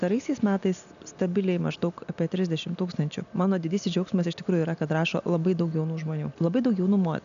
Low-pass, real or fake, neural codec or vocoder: 7.2 kHz; real; none